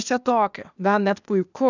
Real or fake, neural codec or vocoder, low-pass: fake; codec, 16 kHz, 0.8 kbps, ZipCodec; 7.2 kHz